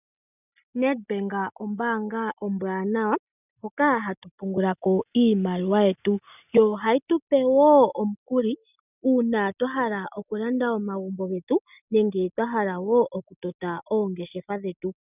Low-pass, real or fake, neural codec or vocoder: 3.6 kHz; real; none